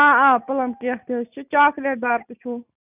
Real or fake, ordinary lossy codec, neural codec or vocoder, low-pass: real; none; none; 3.6 kHz